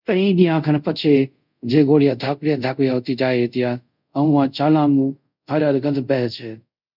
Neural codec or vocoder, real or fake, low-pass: codec, 24 kHz, 0.5 kbps, DualCodec; fake; 5.4 kHz